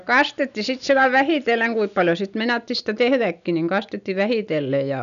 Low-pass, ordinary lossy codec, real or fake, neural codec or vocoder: 7.2 kHz; none; real; none